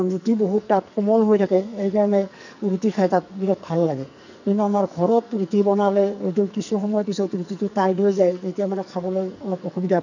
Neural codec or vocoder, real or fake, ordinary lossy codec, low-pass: codec, 44.1 kHz, 2.6 kbps, SNAC; fake; none; 7.2 kHz